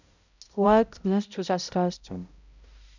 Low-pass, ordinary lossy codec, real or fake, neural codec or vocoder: 7.2 kHz; none; fake; codec, 16 kHz, 0.5 kbps, X-Codec, HuBERT features, trained on balanced general audio